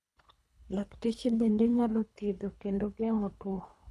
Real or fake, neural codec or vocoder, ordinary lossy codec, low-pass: fake; codec, 24 kHz, 3 kbps, HILCodec; none; none